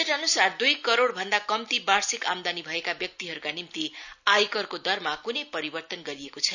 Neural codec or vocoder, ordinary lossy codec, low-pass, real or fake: none; none; 7.2 kHz; real